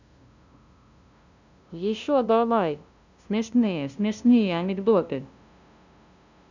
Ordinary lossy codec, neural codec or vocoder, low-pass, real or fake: none; codec, 16 kHz, 0.5 kbps, FunCodec, trained on LibriTTS, 25 frames a second; 7.2 kHz; fake